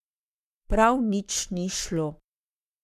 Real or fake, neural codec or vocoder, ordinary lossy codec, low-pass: fake; codec, 44.1 kHz, 7.8 kbps, Pupu-Codec; none; 14.4 kHz